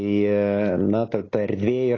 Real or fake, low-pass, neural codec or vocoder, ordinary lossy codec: real; 7.2 kHz; none; AAC, 32 kbps